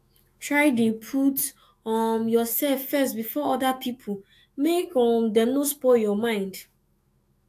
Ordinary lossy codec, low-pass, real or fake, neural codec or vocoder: AAC, 64 kbps; 14.4 kHz; fake; autoencoder, 48 kHz, 128 numbers a frame, DAC-VAE, trained on Japanese speech